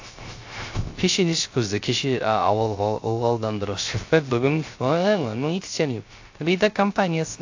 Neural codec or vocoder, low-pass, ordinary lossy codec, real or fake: codec, 16 kHz, 0.3 kbps, FocalCodec; 7.2 kHz; AAC, 48 kbps; fake